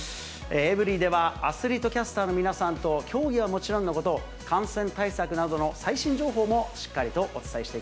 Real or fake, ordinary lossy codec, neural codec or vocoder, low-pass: real; none; none; none